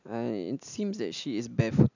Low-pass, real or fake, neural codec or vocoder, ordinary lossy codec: 7.2 kHz; real; none; none